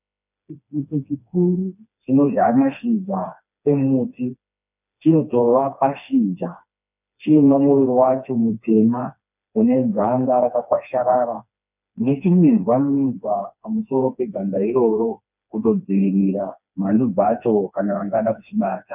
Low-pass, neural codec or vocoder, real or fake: 3.6 kHz; codec, 16 kHz, 2 kbps, FreqCodec, smaller model; fake